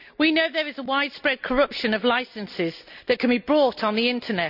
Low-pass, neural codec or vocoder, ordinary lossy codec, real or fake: 5.4 kHz; none; none; real